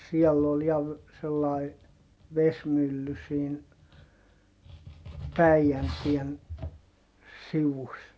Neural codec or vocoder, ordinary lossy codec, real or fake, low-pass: none; none; real; none